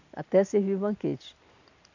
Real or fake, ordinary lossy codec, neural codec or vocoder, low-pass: real; none; none; 7.2 kHz